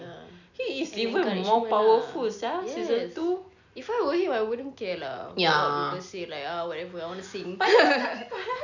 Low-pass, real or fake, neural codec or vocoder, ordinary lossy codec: 7.2 kHz; real; none; none